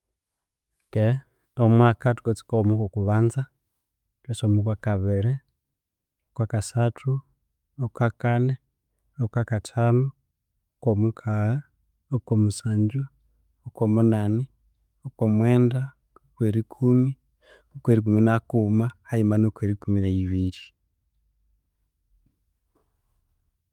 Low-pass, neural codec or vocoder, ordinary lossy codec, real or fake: 19.8 kHz; autoencoder, 48 kHz, 128 numbers a frame, DAC-VAE, trained on Japanese speech; Opus, 32 kbps; fake